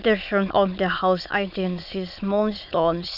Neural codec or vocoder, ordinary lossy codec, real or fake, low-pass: autoencoder, 22.05 kHz, a latent of 192 numbers a frame, VITS, trained on many speakers; none; fake; 5.4 kHz